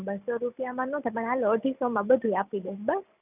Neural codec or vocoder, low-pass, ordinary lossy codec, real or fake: none; 3.6 kHz; none; real